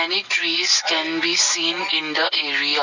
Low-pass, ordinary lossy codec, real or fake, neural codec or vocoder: 7.2 kHz; none; real; none